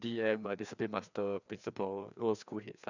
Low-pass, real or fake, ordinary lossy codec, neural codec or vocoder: 7.2 kHz; fake; none; codec, 16 kHz, 2 kbps, FreqCodec, larger model